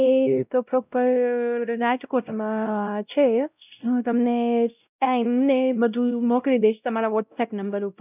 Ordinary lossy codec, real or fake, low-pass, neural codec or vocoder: none; fake; 3.6 kHz; codec, 16 kHz, 0.5 kbps, X-Codec, WavLM features, trained on Multilingual LibriSpeech